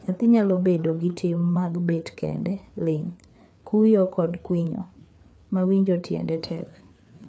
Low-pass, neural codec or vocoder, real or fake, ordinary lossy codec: none; codec, 16 kHz, 4 kbps, FreqCodec, larger model; fake; none